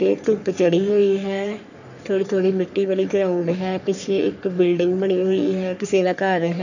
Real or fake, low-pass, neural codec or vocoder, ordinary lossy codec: fake; 7.2 kHz; codec, 44.1 kHz, 3.4 kbps, Pupu-Codec; none